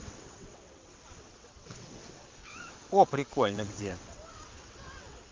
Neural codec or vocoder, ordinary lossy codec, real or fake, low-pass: vocoder, 22.05 kHz, 80 mel bands, WaveNeXt; Opus, 32 kbps; fake; 7.2 kHz